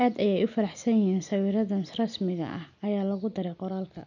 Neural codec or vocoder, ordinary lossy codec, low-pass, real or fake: none; none; 7.2 kHz; real